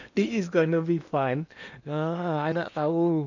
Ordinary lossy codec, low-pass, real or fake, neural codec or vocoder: none; 7.2 kHz; fake; codec, 16 kHz in and 24 kHz out, 0.8 kbps, FocalCodec, streaming, 65536 codes